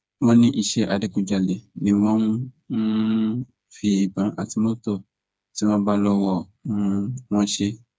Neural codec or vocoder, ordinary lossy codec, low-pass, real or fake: codec, 16 kHz, 4 kbps, FreqCodec, smaller model; none; none; fake